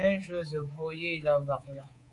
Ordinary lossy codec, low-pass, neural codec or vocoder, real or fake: AAC, 64 kbps; 10.8 kHz; codec, 24 kHz, 3.1 kbps, DualCodec; fake